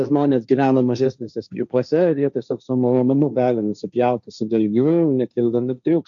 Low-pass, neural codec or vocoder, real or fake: 7.2 kHz; codec, 16 kHz, 1.1 kbps, Voila-Tokenizer; fake